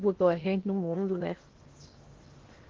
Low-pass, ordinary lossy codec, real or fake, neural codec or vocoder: 7.2 kHz; Opus, 16 kbps; fake; codec, 16 kHz in and 24 kHz out, 0.8 kbps, FocalCodec, streaming, 65536 codes